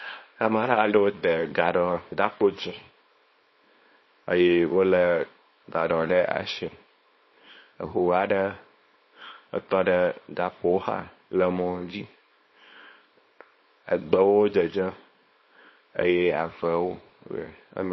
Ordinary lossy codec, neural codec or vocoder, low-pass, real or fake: MP3, 24 kbps; codec, 24 kHz, 0.9 kbps, WavTokenizer, small release; 7.2 kHz; fake